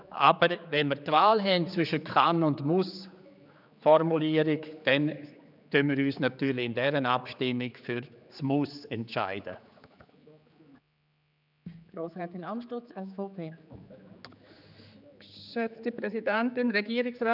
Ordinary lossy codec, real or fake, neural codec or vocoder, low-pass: none; fake; codec, 16 kHz, 4 kbps, X-Codec, HuBERT features, trained on general audio; 5.4 kHz